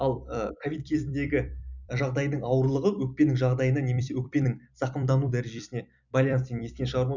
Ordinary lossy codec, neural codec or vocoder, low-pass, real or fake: none; vocoder, 44.1 kHz, 128 mel bands every 256 samples, BigVGAN v2; 7.2 kHz; fake